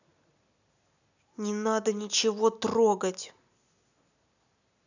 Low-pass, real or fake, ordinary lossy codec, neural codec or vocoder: 7.2 kHz; real; none; none